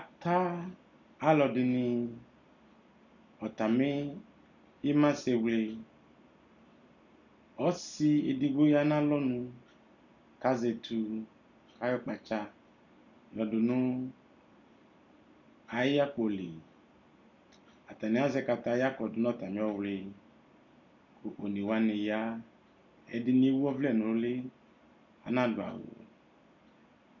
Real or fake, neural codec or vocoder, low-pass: real; none; 7.2 kHz